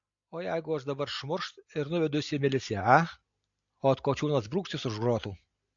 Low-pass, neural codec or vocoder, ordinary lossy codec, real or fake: 7.2 kHz; none; AAC, 64 kbps; real